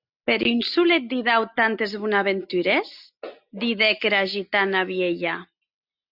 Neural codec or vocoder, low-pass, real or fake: none; 5.4 kHz; real